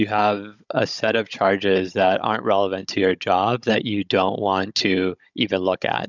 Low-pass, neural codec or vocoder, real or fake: 7.2 kHz; codec, 16 kHz, 16 kbps, FunCodec, trained on Chinese and English, 50 frames a second; fake